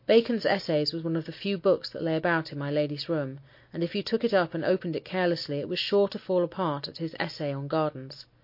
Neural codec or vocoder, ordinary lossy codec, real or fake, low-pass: none; MP3, 32 kbps; real; 5.4 kHz